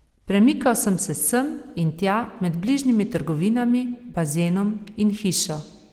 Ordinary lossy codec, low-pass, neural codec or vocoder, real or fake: Opus, 16 kbps; 19.8 kHz; none; real